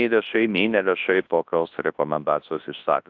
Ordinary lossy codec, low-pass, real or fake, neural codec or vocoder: AAC, 48 kbps; 7.2 kHz; fake; codec, 24 kHz, 0.9 kbps, WavTokenizer, large speech release